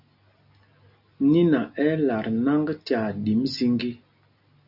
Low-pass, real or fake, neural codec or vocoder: 5.4 kHz; real; none